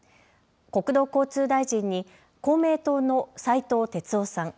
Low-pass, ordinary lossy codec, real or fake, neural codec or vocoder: none; none; real; none